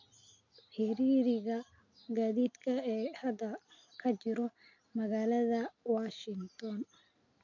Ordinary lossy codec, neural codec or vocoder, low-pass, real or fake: none; none; 7.2 kHz; real